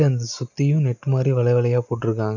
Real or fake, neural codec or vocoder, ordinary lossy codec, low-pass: real; none; none; 7.2 kHz